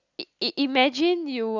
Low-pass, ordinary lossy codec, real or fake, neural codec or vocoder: 7.2 kHz; Opus, 64 kbps; real; none